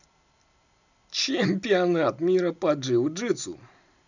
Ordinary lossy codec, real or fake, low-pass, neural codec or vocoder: none; real; 7.2 kHz; none